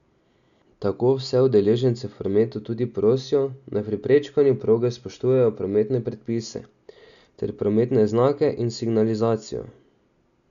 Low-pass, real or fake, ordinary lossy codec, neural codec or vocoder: 7.2 kHz; real; none; none